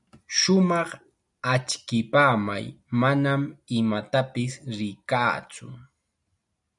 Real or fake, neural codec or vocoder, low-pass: real; none; 10.8 kHz